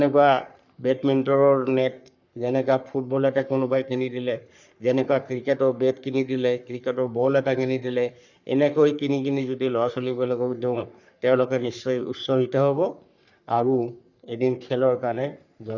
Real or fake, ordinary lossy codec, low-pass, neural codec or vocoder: fake; none; 7.2 kHz; codec, 44.1 kHz, 3.4 kbps, Pupu-Codec